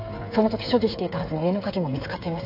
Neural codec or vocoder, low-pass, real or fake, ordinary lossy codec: codec, 16 kHz, 8 kbps, FreqCodec, smaller model; 5.4 kHz; fake; AAC, 32 kbps